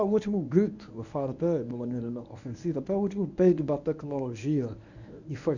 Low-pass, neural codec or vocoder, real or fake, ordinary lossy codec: 7.2 kHz; codec, 24 kHz, 0.9 kbps, WavTokenizer, medium speech release version 1; fake; none